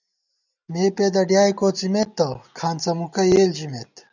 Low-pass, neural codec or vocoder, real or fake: 7.2 kHz; none; real